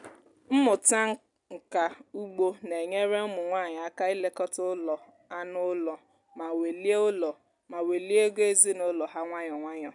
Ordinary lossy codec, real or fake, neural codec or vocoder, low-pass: none; real; none; 10.8 kHz